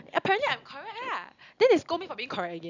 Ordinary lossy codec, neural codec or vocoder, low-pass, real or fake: none; none; 7.2 kHz; real